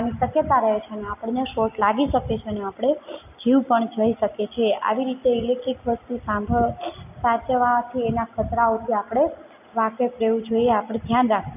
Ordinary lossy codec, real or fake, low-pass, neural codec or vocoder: none; real; 3.6 kHz; none